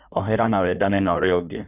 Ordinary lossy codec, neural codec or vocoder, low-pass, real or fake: none; codec, 16 kHz in and 24 kHz out, 1.1 kbps, FireRedTTS-2 codec; 3.6 kHz; fake